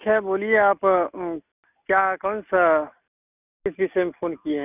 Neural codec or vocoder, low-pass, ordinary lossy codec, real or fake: none; 3.6 kHz; none; real